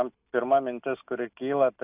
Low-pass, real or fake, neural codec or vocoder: 3.6 kHz; real; none